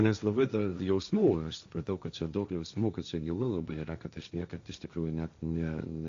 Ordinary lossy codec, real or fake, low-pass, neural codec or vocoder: AAC, 64 kbps; fake; 7.2 kHz; codec, 16 kHz, 1.1 kbps, Voila-Tokenizer